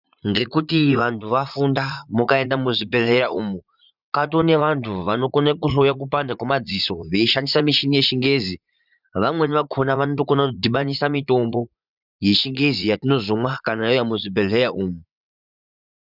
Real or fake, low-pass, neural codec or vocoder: fake; 5.4 kHz; vocoder, 44.1 kHz, 80 mel bands, Vocos